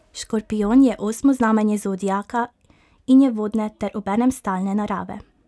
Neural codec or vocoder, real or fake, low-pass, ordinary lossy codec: none; real; none; none